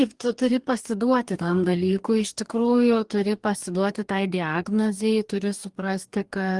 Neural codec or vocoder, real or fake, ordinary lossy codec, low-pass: codec, 44.1 kHz, 2.6 kbps, DAC; fake; Opus, 24 kbps; 10.8 kHz